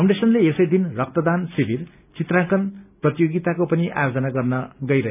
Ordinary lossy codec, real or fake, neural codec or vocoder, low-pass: none; real; none; 3.6 kHz